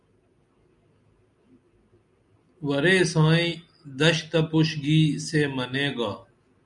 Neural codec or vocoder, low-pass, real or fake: none; 10.8 kHz; real